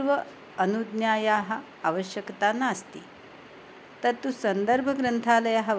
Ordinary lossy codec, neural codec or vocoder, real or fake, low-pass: none; none; real; none